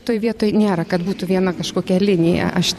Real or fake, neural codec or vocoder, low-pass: fake; vocoder, 44.1 kHz, 128 mel bands every 512 samples, BigVGAN v2; 14.4 kHz